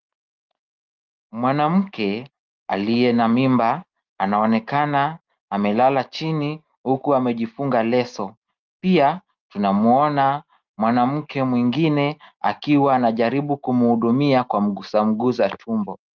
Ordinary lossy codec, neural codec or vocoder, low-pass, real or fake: Opus, 32 kbps; none; 7.2 kHz; real